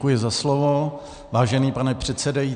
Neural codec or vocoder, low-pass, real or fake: none; 9.9 kHz; real